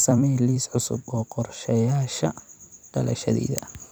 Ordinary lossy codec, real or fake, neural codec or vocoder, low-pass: none; fake; vocoder, 44.1 kHz, 128 mel bands every 256 samples, BigVGAN v2; none